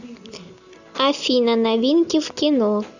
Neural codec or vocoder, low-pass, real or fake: none; 7.2 kHz; real